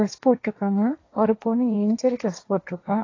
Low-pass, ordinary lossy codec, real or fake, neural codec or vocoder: 7.2 kHz; AAC, 32 kbps; fake; codec, 16 kHz, 1.1 kbps, Voila-Tokenizer